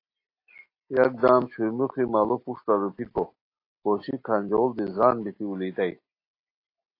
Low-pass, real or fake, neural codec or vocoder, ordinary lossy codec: 5.4 kHz; real; none; AAC, 32 kbps